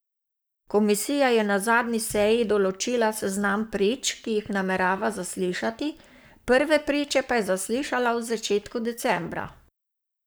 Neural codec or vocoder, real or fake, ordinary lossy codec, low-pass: codec, 44.1 kHz, 7.8 kbps, Pupu-Codec; fake; none; none